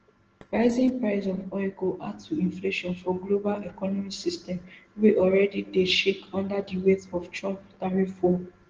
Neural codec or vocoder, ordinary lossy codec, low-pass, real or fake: none; Opus, 16 kbps; 7.2 kHz; real